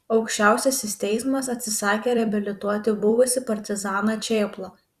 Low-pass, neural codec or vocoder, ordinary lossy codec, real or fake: 14.4 kHz; vocoder, 44.1 kHz, 128 mel bands every 256 samples, BigVGAN v2; Opus, 64 kbps; fake